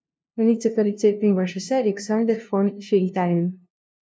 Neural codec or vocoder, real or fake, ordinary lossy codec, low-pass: codec, 16 kHz, 0.5 kbps, FunCodec, trained on LibriTTS, 25 frames a second; fake; none; none